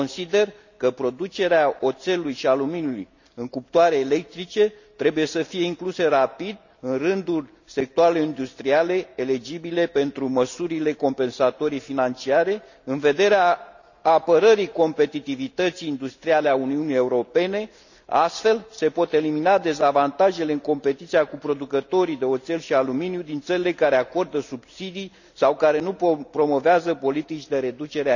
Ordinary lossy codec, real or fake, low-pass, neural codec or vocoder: none; real; 7.2 kHz; none